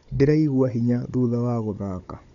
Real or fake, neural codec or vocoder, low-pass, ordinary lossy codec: fake; codec, 16 kHz, 16 kbps, FunCodec, trained on Chinese and English, 50 frames a second; 7.2 kHz; none